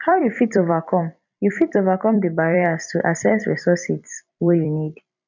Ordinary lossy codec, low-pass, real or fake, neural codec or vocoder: none; 7.2 kHz; fake; vocoder, 44.1 kHz, 128 mel bands every 256 samples, BigVGAN v2